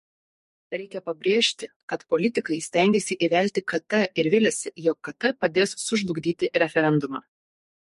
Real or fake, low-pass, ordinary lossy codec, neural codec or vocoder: fake; 14.4 kHz; MP3, 48 kbps; codec, 44.1 kHz, 2.6 kbps, SNAC